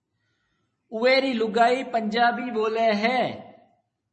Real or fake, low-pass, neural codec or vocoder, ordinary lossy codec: fake; 10.8 kHz; vocoder, 44.1 kHz, 128 mel bands every 256 samples, BigVGAN v2; MP3, 32 kbps